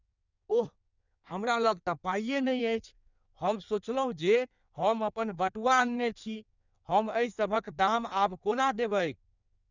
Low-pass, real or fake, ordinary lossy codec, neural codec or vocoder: 7.2 kHz; fake; none; codec, 16 kHz in and 24 kHz out, 1.1 kbps, FireRedTTS-2 codec